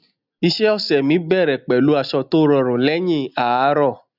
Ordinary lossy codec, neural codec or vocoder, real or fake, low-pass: none; none; real; 5.4 kHz